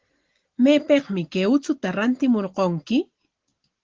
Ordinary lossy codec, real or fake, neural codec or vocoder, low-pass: Opus, 16 kbps; real; none; 7.2 kHz